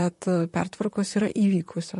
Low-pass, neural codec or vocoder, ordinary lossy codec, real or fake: 14.4 kHz; vocoder, 44.1 kHz, 128 mel bands, Pupu-Vocoder; MP3, 48 kbps; fake